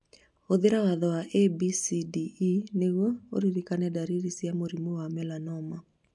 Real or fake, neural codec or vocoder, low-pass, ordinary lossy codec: real; none; 10.8 kHz; none